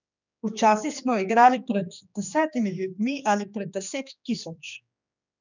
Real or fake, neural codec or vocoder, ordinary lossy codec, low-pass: fake; codec, 16 kHz, 2 kbps, X-Codec, HuBERT features, trained on general audio; none; 7.2 kHz